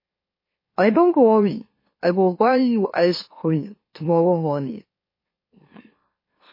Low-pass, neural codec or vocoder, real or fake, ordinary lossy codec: 5.4 kHz; autoencoder, 44.1 kHz, a latent of 192 numbers a frame, MeloTTS; fake; MP3, 24 kbps